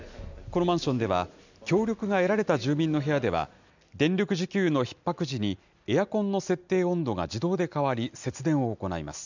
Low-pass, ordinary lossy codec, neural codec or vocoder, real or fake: 7.2 kHz; none; none; real